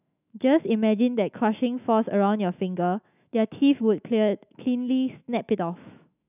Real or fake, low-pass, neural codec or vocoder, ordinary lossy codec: fake; 3.6 kHz; autoencoder, 48 kHz, 128 numbers a frame, DAC-VAE, trained on Japanese speech; none